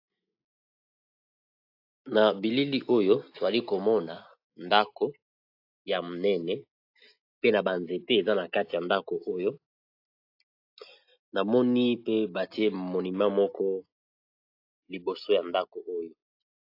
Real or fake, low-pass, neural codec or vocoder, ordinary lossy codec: real; 5.4 kHz; none; AAC, 32 kbps